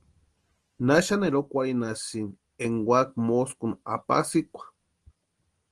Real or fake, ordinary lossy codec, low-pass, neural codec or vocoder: real; Opus, 24 kbps; 10.8 kHz; none